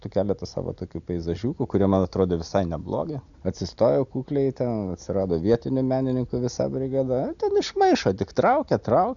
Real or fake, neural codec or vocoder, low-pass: real; none; 7.2 kHz